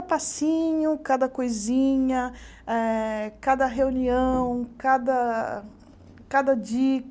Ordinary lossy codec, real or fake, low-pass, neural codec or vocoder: none; real; none; none